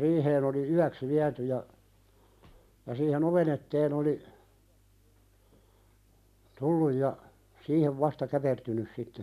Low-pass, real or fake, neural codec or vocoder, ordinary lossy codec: 14.4 kHz; real; none; none